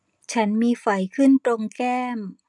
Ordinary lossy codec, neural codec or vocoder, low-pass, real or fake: none; none; 10.8 kHz; real